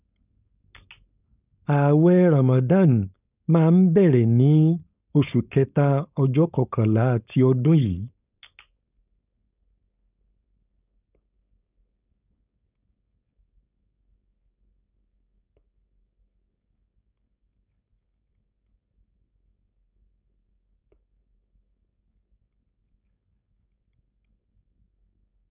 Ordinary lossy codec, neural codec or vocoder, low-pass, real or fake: none; codec, 16 kHz, 4.8 kbps, FACodec; 3.6 kHz; fake